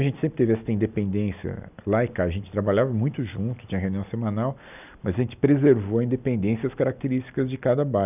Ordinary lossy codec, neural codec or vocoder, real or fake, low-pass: none; none; real; 3.6 kHz